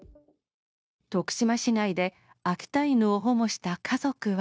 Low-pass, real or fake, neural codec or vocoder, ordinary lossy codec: none; fake; codec, 16 kHz, 0.9 kbps, LongCat-Audio-Codec; none